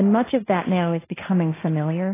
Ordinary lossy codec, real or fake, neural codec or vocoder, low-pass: AAC, 16 kbps; fake; codec, 16 kHz, 1.1 kbps, Voila-Tokenizer; 3.6 kHz